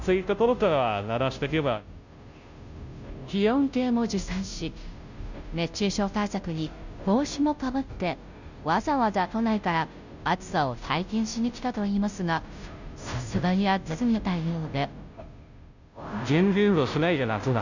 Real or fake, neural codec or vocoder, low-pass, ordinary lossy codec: fake; codec, 16 kHz, 0.5 kbps, FunCodec, trained on Chinese and English, 25 frames a second; 7.2 kHz; none